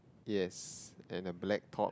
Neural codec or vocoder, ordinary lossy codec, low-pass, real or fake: none; none; none; real